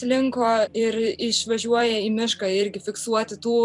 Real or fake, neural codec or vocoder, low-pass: fake; vocoder, 44.1 kHz, 128 mel bands every 256 samples, BigVGAN v2; 10.8 kHz